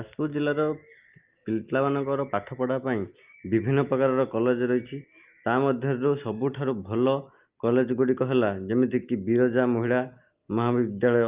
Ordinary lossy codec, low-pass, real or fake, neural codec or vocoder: Opus, 24 kbps; 3.6 kHz; real; none